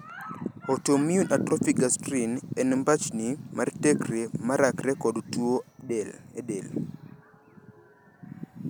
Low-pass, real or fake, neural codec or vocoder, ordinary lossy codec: none; real; none; none